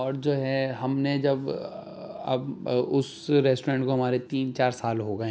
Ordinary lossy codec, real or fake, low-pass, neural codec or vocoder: none; real; none; none